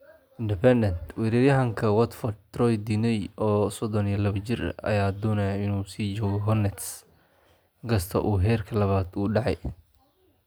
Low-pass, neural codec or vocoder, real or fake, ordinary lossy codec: none; none; real; none